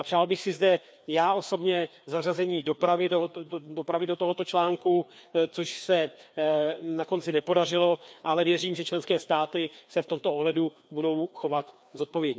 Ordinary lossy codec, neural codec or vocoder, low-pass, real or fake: none; codec, 16 kHz, 2 kbps, FreqCodec, larger model; none; fake